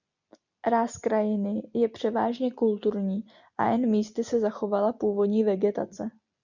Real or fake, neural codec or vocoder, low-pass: real; none; 7.2 kHz